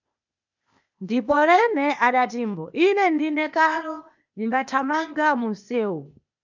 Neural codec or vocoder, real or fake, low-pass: codec, 16 kHz, 0.8 kbps, ZipCodec; fake; 7.2 kHz